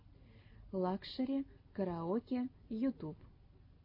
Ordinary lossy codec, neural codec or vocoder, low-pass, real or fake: MP3, 32 kbps; none; 5.4 kHz; real